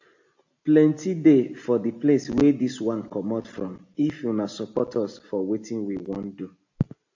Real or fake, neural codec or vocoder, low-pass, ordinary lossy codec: real; none; 7.2 kHz; AAC, 48 kbps